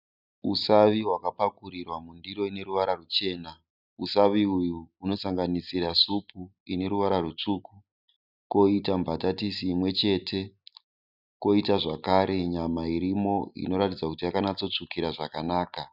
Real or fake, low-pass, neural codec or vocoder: real; 5.4 kHz; none